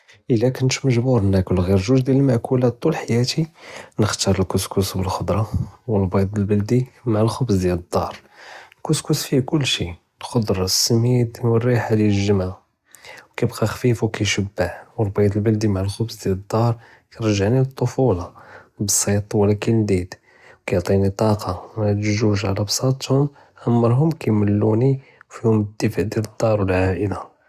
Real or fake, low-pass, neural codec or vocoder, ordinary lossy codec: real; 14.4 kHz; none; Opus, 64 kbps